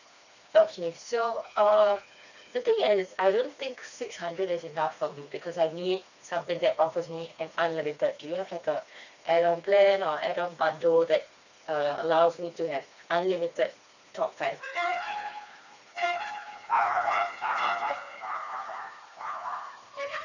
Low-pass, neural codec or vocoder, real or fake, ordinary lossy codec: 7.2 kHz; codec, 16 kHz, 2 kbps, FreqCodec, smaller model; fake; none